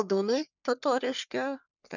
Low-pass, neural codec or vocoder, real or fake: 7.2 kHz; codec, 44.1 kHz, 3.4 kbps, Pupu-Codec; fake